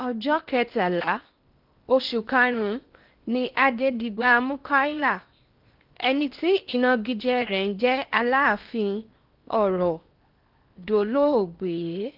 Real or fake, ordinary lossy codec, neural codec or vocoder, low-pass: fake; Opus, 32 kbps; codec, 16 kHz in and 24 kHz out, 0.8 kbps, FocalCodec, streaming, 65536 codes; 5.4 kHz